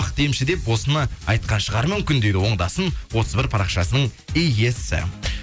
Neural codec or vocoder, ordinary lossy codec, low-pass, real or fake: none; none; none; real